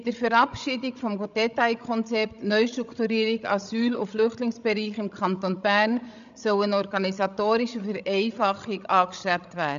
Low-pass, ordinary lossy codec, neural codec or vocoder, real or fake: 7.2 kHz; AAC, 96 kbps; codec, 16 kHz, 16 kbps, FreqCodec, larger model; fake